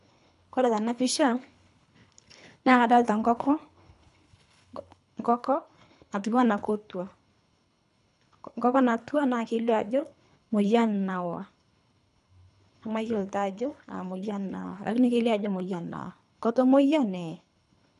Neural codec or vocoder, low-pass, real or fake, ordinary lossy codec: codec, 24 kHz, 3 kbps, HILCodec; 10.8 kHz; fake; none